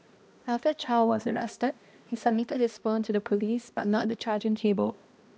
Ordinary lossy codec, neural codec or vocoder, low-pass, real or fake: none; codec, 16 kHz, 1 kbps, X-Codec, HuBERT features, trained on balanced general audio; none; fake